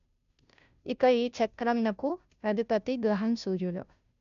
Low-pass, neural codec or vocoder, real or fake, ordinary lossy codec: 7.2 kHz; codec, 16 kHz, 0.5 kbps, FunCodec, trained on Chinese and English, 25 frames a second; fake; none